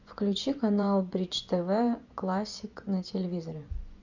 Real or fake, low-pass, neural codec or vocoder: fake; 7.2 kHz; vocoder, 24 kHz, 100 mel bands, Vocos